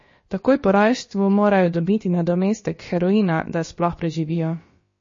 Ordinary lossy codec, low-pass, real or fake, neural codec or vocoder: MP3, 32 kbps; 7.2 kHz; fake; codec, 16 kHz, about 1 kbps, DyCAST, with the encoder's durations